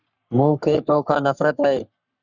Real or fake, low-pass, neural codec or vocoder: fake; 7.2 kHz; codec, 44.1 kHz, 3.4 kbps, Pupu-Codec